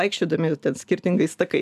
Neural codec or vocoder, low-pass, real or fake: none; 14.4 kHz; real